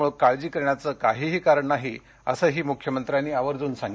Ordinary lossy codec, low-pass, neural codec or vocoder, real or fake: none; none; none; real